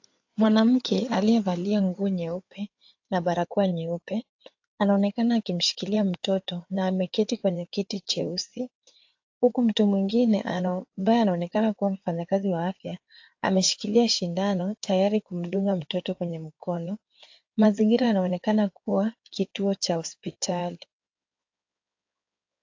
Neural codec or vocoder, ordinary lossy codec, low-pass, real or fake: codec, 16 kHz in and 24 kHz out, 2.2 kbps, FireRedTTS-2 codec; AAC, 48 kbps; 7.2 kHz; fake